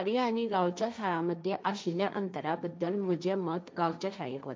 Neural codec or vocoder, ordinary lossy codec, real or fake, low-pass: codec, 16 kHz, 1.1 kbps, Voila-Tokenizer; none; fake; none